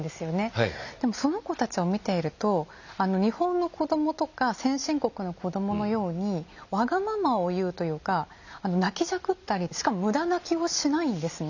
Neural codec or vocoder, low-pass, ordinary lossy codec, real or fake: none; 7.2 kHz; none; real